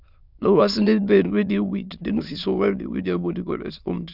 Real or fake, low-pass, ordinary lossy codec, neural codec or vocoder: fake; 5.4 kHz; none; autoencoder, 22.05 kHz, a latent of 192 numbers a frame, VITS, trained on many speakers